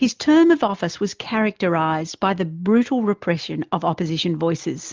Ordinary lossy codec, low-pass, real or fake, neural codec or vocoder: Opus, 32 kbps; 7.2 kHz; real; none